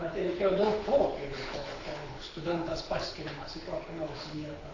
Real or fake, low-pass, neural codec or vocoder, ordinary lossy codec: fake; 7.2 kHz; codec, 24 kHz, 6 kbps, HILCodec; AAC, 32 kbps